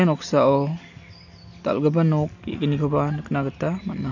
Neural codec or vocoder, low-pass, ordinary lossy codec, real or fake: none; 7.2 kHz; none; real